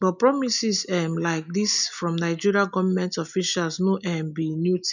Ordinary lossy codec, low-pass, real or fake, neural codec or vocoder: none; 7.2 kHz; real; none